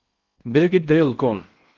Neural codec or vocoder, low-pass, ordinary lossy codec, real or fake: codec, 16 kHz in and 24 kHz out, 0.6 kbps, FocalCodec, streaming, 4096 codes; 7.2 kHz; Opus, 32 kbps; fake